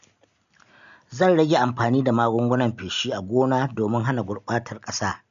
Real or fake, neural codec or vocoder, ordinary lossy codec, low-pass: real; none; AAC, 96 kbps; 7.2 kHz